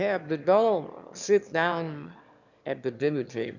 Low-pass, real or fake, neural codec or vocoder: 7.2 kHz; fake; autoencoder, 22.05 kHz, a latent of 192 numbers a frame, VITS, trained on one speaker